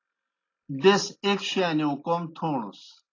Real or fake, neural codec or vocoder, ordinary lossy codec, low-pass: real; none; AAC, 32 kbps; 7.2 kHz